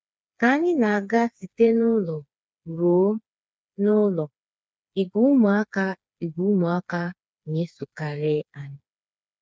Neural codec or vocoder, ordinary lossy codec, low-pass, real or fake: codec, 16 kHz, 4 kbps, FreqCodec, smaller model; none; none; fake